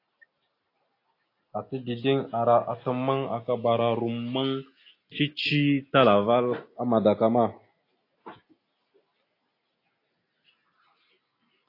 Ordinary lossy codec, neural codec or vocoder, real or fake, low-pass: AAC, 32 kbps; none; real; 5.4 kHz